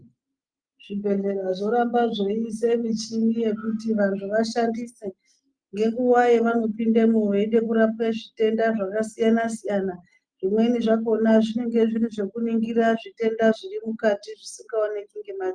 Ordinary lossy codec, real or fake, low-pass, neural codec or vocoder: Opus, 32 kbps; real; 9.9 kHz; none